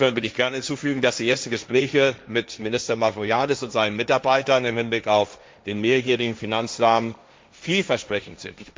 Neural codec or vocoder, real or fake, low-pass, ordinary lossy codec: codec, 16 kHz, 1.1 kbps, Voila-Tokenizer; fake; none; none